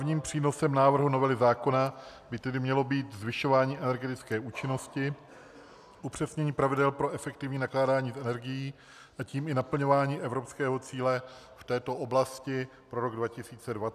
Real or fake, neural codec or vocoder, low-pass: real; none; 14.4 kHz